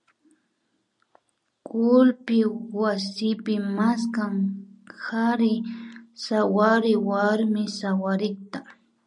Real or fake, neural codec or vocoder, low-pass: real; none; 10.8 kHz